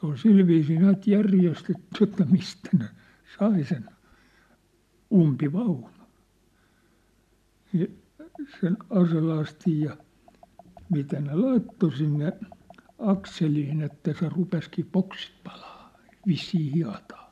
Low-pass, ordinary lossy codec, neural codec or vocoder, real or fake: 14.4 kHz; MP3, 96 kbps; none; real